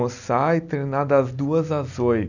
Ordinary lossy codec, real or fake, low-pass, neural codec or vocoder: none; real; 7.2 kHz; none